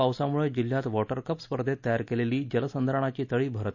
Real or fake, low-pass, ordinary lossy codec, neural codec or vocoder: real; 7.2 kHz; none; none